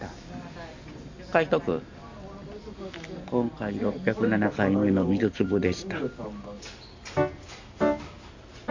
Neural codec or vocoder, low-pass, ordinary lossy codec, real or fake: none; 7.2 kHz; MP3, 48 kbps; real